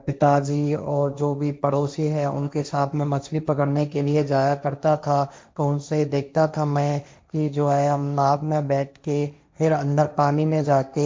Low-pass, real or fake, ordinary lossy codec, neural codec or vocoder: none; fake; none; codec, 16 kHz, 1.1 kbps, Voila-Tokenizer